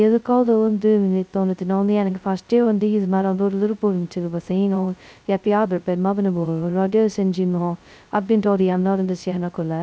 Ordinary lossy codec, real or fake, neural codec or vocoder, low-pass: none; fake; codec, 16 kHz, 0.2 kbps, FocalCodec; none